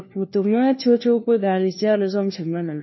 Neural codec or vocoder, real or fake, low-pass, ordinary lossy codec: codec, 16 kHz, 1 kbps, FunCodec, trained on LibriTTS, 50 frames a second; fake; 7.2 kHz; MP3, 24 kbps